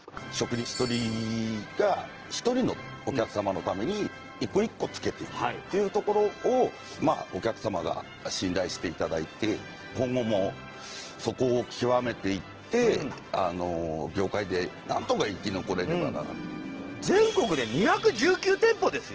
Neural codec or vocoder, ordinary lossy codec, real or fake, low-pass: none; Opus, 16 kbps; real; 7.2 kHz